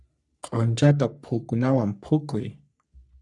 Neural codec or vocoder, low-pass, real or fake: codec, 44.1 kHz, 3.4 kbps, Pupu-Codec; 10.8 kHz; fake